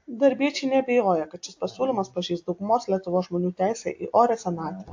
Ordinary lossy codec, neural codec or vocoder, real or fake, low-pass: AAC, 48 kbps; none; real; 7.2 kHz